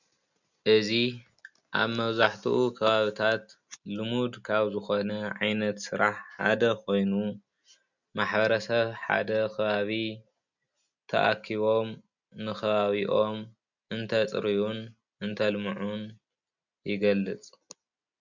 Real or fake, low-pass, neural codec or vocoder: real; 7.2 kHz; none